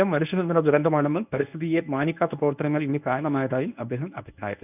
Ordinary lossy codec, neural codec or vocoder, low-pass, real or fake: none; codec, 24 kHz, 0.9 kbps, WavTokenizer, medium speech release version 1; 3.6 kHz; fake